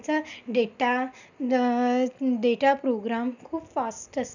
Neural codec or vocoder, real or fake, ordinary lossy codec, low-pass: none; real; none; 7.2 kHz